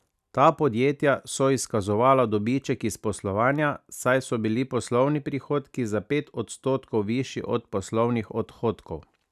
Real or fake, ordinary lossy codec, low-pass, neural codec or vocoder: real; none; 14.4 kHz; none